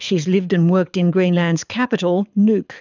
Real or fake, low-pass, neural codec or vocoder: fake; 7.2 kHz; codec, 24 kHz, 6 kbps, HILCodec